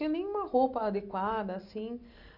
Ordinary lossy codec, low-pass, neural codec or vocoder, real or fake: none; 5.4 kHz; none; real